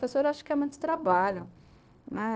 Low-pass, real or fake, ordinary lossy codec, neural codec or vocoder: none; fake; none; codec, 16 kHz, 0.9 kbps, LongCat-Audio-Codec